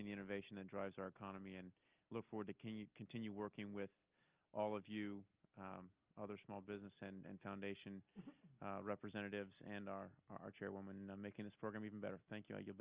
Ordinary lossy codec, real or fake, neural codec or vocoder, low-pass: Opus, 24 kbps; real; none; 3.6 kHz